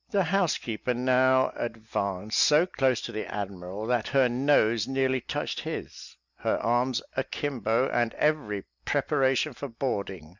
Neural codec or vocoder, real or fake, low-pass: none; real; 7.2 kHz